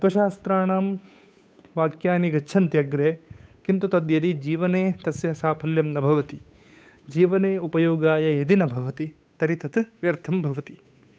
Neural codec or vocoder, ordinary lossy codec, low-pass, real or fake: codec, 16 kHz, 8 kbps, FunCodec, trained on Chinese and English, 25 frames a second; none; none; fake